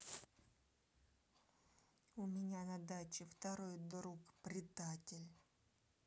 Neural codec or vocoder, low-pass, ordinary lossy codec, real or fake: none; none; none; real